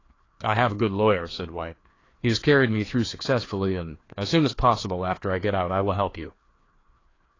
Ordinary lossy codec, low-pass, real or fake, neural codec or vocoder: AAC, 32 kbps; 7.2 kHz; fake; codec, 16 kHz, 2 kbps, FreqCodec, larger model